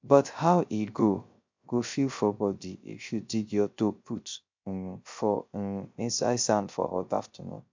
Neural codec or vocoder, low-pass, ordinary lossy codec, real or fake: codec, 16 kHz, 0.3 kbps, FocalCodec; 7.2 kHz; MP3, 64 kbps; fake